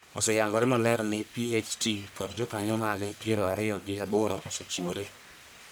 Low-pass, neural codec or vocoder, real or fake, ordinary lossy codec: none; codec, 44.1 kHz, 1.7 kbps, Pupu-Codec; fake; none